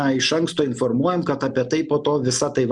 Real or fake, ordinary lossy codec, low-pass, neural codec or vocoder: real; Opus, 64 kbps; 10.8 kHz; none